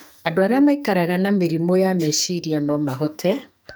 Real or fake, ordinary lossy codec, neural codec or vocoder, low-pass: fake; none; codec, 44.1 kHz, 2.6 kbps, SNAC; none